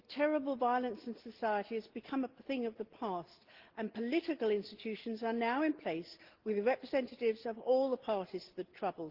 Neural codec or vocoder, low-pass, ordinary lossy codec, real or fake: none; 5.4 kHz; Opus, 16 kbps; real